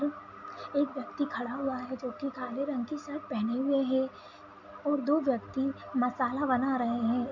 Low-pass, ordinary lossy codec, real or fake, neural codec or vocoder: 7.2 kHz; MP3, 64 kbps; real; none